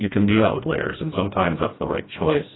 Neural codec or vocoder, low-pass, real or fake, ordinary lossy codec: codec, 16 kHz, 1 kbps, FreqCodec, smaller model; 7.2 kHz; fake; AAC, 16 kbps